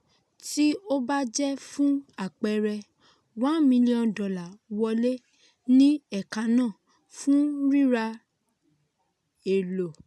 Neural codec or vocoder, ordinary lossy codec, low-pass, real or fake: none; none; none; real